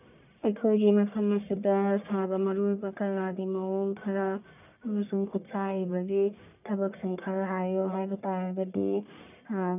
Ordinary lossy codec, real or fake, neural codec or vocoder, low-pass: none; fake; codec, 44.1 kHz, 1.7 kbps, Pupu-Codec; 3.6 kHz